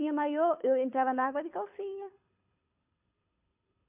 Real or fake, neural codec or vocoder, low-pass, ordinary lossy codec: fake; codec, 24 kHz, 6 kbps, HILCodec; 3.6 kHz; MP3, 32 kbps